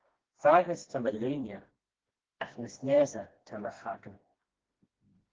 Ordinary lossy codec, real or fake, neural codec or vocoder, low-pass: Opus, 16 kbps; fake; codec, 16 kHz, 1 kbps, FreqCodec, smaller model; 7.2 kHz